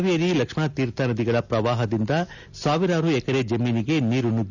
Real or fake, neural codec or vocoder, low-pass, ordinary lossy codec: real; none; 7.2 kHz; none